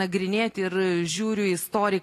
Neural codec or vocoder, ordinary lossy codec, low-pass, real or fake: none; AAC, 48 kbps; 14.4 kHz; real